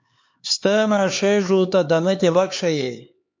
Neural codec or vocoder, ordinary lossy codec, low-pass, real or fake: codec, 16 kHz, 4 kbps, X-Codec, HuBERT features, trained on LibriSpeech; MP3, 48 kbps; 7.2 kHz; fake